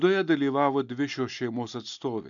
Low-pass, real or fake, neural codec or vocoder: 7.2 kHz; real; none